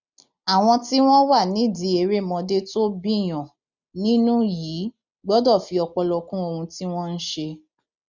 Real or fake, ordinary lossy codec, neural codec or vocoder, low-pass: real; none; none; 7.2 kHz